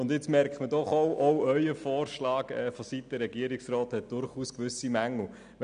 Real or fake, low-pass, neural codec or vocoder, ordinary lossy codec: real; 9.9 kHz; none; none